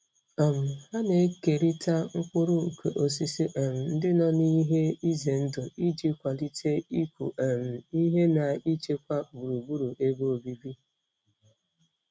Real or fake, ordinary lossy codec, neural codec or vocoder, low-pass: real; none; none; none